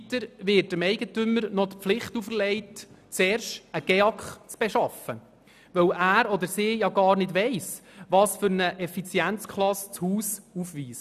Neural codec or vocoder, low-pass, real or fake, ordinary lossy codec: none; 14.4 kHz; real; none